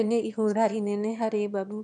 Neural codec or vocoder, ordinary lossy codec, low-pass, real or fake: autoencoder, 22.05 kHz, a latent of 192 numbers a frame, VITS, trained on one speaker; none; 9.9 kHz; fake